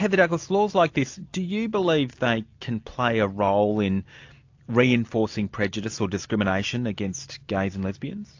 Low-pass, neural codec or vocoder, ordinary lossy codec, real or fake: 7.2 kHz; none; AAC, 48 kbps; real